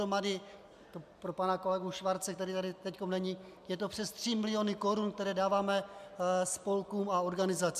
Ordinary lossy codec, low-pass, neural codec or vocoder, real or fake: MP3, 96 kbps; 14.4 kHz; none; real